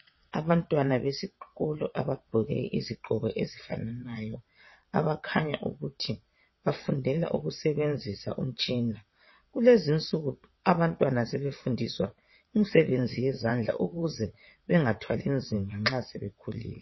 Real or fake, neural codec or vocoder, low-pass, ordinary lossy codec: fake; vocoder, 22.05 kHz, 80 mel bands, WaveNeXt; 7.2 kHz; MP3, 24 kbps